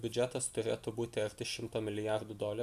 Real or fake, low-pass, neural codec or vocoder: real; 14.4 kHz; none